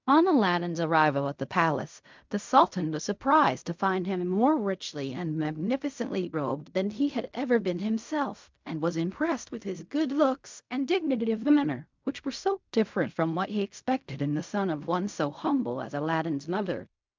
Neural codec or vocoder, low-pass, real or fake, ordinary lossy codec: codec, 16 kHz in and 24 kHz out, 0.4 kbps, LongCat-Audio-Codec, fine tuned four codebook decoder; 7.2 kHz; fake; MP3, 64 kbps